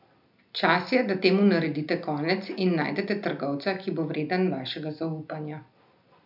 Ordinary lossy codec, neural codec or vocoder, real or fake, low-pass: none; none; real; 5.4 kHz